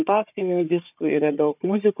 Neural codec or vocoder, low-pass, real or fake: codec, 16 kHz, 4 kbps, FunCodec, trained on Chinese and English, 50 frames a second; 3.6 kHz; fake